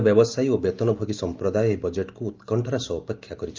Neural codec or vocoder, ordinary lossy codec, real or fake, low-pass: none; Opus, 32 kbps; real; 7.2 kHz